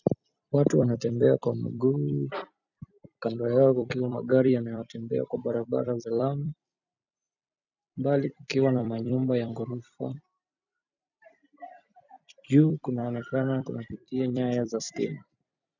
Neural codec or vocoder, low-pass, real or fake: vocoder, 44.1 kHz, 128 mel bands every 256 samples, BigVGAN v2; 7.2 kHz; fake